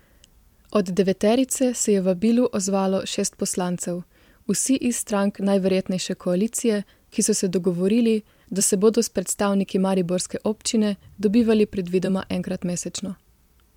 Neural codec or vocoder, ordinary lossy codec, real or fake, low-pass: vocoder, 44.1 kHz, 128 mel bands every 256 samples, BigVGAN v2; MP3, 96 kbps; fake; 19.8 kHz